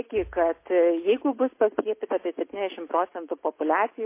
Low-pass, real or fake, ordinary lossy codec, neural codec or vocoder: 3.6 kHz; real; MP3, 24 kbps; none